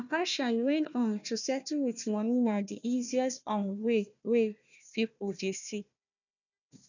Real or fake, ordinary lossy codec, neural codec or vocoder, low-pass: fake; none; codec, 16 kHz, 1 kbps, FunCodec, trained on Chinese and English, 50 frames a second; 7.2 kHz